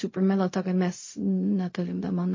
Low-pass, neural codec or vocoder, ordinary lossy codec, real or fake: 7.2 kHz; codec, 16 kHz, 0.4 kbps, LongCat-Audio-Codec; MP3, 32 kbps; fake